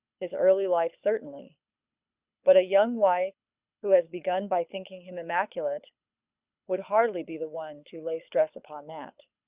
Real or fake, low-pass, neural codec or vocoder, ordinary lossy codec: fake; 3.6 kHz; codec, 24 kHz, 6 kbps, HILCodec; Opus, 64 kbps